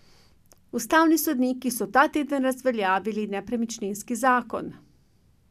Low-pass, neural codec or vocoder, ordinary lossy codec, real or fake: 14.4 kHz; none; none; real